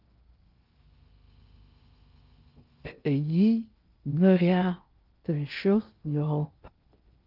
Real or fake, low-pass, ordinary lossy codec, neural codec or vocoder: fake; 5.4 kHz; Opus, 32 kbps; codec, 16 kHz in and 24 kHz out, 0.6 kbps, FocalCodec, streaming, 2048 codes